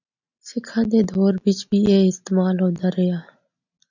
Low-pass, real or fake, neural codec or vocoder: 7.2 kHz; real; none